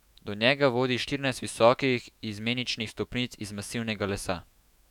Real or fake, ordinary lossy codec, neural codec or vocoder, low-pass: fake; none; autoencoder, 48 kHz, 128 numbers a frame, DAC-VAE, trained on Japanese speech; 19.8 kHz